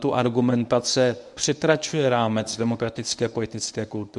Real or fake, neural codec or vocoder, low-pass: fake; codec, 24 kHz, 0.9 kbps, WavTokenizer, medium speech release version 1; 10.8 kHz